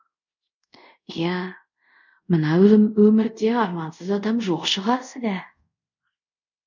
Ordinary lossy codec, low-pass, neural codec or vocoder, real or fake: none; 7.2 kHz; codec, 24 kHz, 0.5 kbps, DualCodec; fake